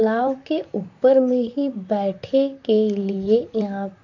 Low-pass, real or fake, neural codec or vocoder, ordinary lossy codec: 7.2 kHz; fake; vocoder, 44.1 kHz, 128 mel bands, Pupu-Vocoder; none